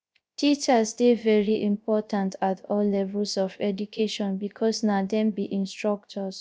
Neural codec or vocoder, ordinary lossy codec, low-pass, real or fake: codec, 16 kHz, 0.3 kbps, FocalCodec; none; none; fake